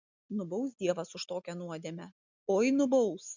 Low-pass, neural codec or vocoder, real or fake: 7.2 kHz; none; real